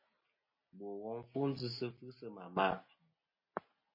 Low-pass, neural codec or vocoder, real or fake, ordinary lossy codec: 5.4 kHz; none; real; MP3, 24 kbps